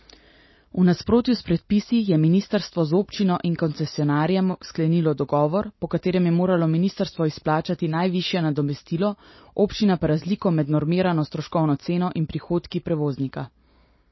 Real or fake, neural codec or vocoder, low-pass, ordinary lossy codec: real; none; 7.2 kHz; MP3, 24 kbps